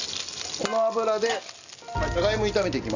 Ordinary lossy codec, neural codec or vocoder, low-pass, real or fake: none; none; 7.2 kHz; real